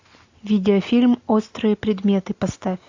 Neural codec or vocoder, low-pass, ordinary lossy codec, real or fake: none; 7.2 kHz; AAC, 48 kbps; real